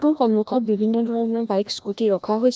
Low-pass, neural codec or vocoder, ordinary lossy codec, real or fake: none; codec, 16 kHz, 1 kbps, FreqCodec, larger model; none; fake